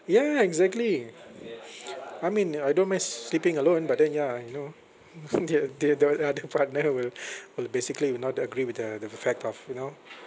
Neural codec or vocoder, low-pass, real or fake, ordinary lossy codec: none; none; real; none